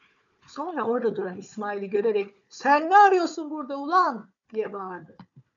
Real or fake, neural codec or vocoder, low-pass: fake; codec, 16 kHz, 4 kbps, FunCodec, trained on Chinese and English, 50 frames a second; 7.2 kHz